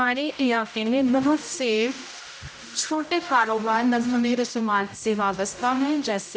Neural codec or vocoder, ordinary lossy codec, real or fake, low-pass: codec, 16 kHz, 0.5 kbps, X-Codec, HuBERT features, trained on general audio; none; fake; none